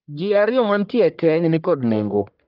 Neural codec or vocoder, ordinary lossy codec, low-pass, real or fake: codec, 44.1 kHz, 1.7 kbps, Pupu-Codec; Opus, 32 kbps; 5.4 kHz; fake